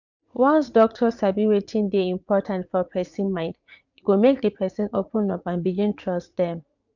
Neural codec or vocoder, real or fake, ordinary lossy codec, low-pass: vocoder, 44.1 kHz, 80 mel bands, Vocos; fake; none; 7.2 kHz